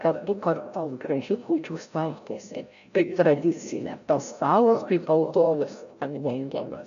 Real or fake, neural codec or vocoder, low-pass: fake; codec, 16 kHz, 0.5 kbps, FreqCodec, larger model; 7.2 kHz